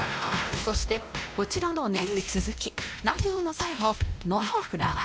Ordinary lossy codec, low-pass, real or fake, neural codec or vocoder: none; none; fake; codec, 16 kHz, 1 kbps, X-Codec, WavLM features, trained on Multilingual LibriSpeech